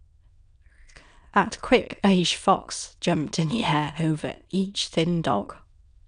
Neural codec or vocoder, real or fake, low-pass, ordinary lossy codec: autoencoder, 22.05 kHz, a latent of 192 numbers a frame, VITS, trained on many speakers; fake; 9.9 kHz; none